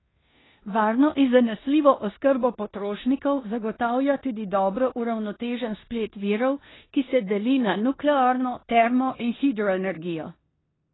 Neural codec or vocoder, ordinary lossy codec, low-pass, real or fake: codec, 16 kHz in and 24 kHz out, 0.9 kbps, LongCat-Audio-Codec, four codebook decoder; AAC, 16 kbps; 7.2 kHz; fake